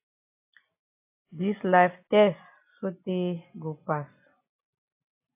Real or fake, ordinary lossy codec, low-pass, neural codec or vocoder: real; AAC, 32 kbps; 3.6 kHz; none